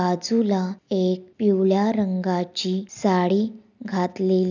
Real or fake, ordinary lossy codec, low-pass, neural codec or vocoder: real; none; 7.2 kHz; none